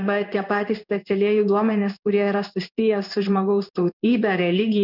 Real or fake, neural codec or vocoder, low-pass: fake; codec, 16 kHz in and 24 kHz out, 1 kbps, XY-Tokenizer; 5.4 kHz